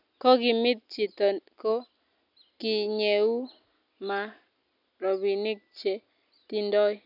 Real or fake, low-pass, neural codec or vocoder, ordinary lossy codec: real; 5.4 kHz; none; none